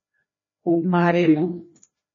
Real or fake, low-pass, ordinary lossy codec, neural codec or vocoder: fake; 7.2 kHz; MP3, 32 kbps; codec, 16 kHz, 1 kbps, FreqCodec, larger model